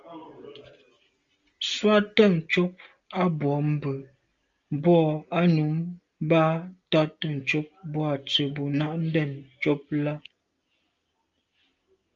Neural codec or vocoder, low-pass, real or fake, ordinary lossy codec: none; 7.2 kHz; real; Opus, 32 kbps